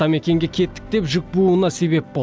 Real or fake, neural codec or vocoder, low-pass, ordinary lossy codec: real; none; none; none